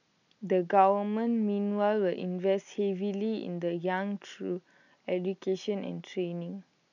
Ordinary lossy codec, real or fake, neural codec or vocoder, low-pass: none; real; none; 7.2 kHz